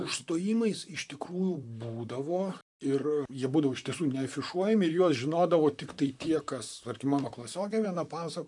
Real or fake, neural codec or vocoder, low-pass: fake; vocoder, 44.1 kHz, 128 mel bands, Pupu-Vocoder; 10.8 kHz